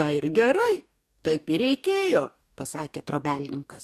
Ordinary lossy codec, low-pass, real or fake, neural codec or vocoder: AAC, 96 kbps; 14.4 kHz; fake; codec, 44.1 kHz, 2.6 kbps, DAC